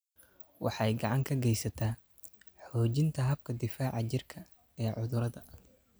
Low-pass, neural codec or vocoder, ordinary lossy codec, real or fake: none; none; none; real